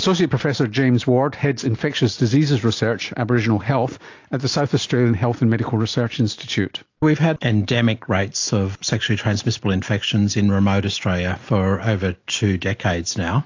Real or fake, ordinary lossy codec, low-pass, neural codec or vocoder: real; AAC, 48 kbps; 7.2 kHz; none